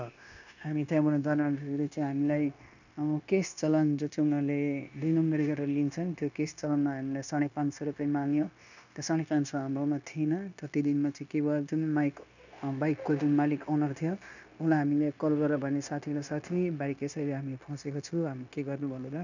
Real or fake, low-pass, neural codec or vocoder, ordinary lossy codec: fake; 7.2 kHz; codec, 16 kHz, 0.9 kbps, LongCat-Audio-Codec; none